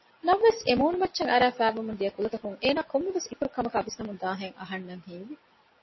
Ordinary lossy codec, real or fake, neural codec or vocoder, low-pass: MP3, 24 kbps; real; none; 7.2 kHz